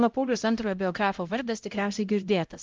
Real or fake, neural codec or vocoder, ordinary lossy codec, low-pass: fake; codec, 16 kHz, 0.5 kbps, X-Codec, HuBERT features, trained on LibriSpeech; Opus, 32 kbps; 7.2 kHz